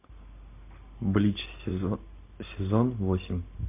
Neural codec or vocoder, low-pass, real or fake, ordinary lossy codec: none; 3.6 kHz; real; MP3, 24 kbps